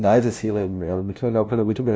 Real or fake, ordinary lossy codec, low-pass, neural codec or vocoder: fake; none; none; codec, 16 kHz, 0.5 kbps, FunCodec, trained on LibriTTS, 25 frames a second